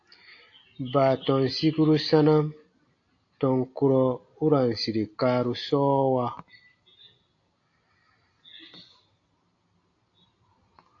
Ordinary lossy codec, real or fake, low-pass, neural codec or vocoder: AAC, 48 kbps; real; 7.2 kHz; none